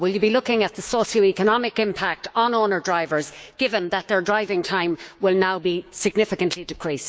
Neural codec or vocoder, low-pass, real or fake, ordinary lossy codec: codec, 16 kHz, 6 kbps, DAC; none; fake; none